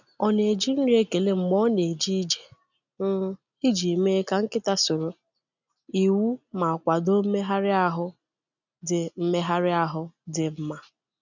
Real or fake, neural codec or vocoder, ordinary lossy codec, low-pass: real; none; none; 7.2 kHz